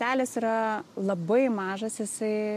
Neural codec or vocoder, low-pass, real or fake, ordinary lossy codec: none; 14.4 kHz; real; MP3, 64 kbps